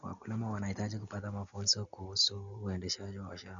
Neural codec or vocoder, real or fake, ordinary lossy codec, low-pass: none; real; none; 7.2 kHz